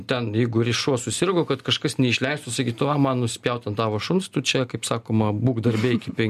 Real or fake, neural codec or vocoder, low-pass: real; none; 14.4 kHz